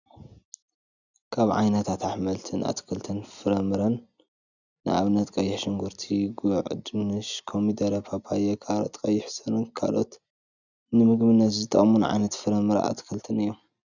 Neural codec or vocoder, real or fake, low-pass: none; real; 7.2 kHz